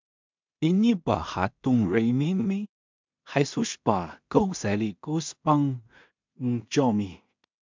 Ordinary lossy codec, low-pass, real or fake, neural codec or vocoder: MP3, 64 kbps; 7.2 kHz; fake; codec, 16 kHz in and 24 kHz out, 0.4 kbps, LongCat-Audio-Codec, two codebook decoder